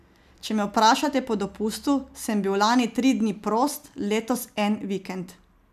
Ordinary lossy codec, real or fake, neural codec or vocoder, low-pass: none; real; none; 14.4 kHz